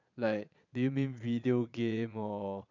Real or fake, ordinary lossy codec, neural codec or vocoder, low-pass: fake; none; vocoder, 22.05 kHz, 80 mel bands, Vocos; 7.2 kHz